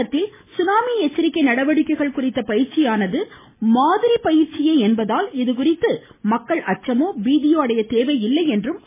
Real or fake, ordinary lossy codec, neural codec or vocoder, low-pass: real; MP3, 16 kbps; none; 3.6 kHz